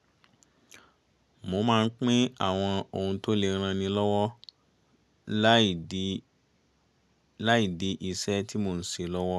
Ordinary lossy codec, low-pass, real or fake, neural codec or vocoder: none; none; real; none